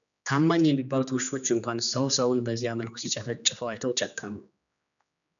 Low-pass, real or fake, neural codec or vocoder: 7.2 kHz; fake; codec, 16 kHz, 2 kbps, X-Codec, HuBERT features, trained on general audio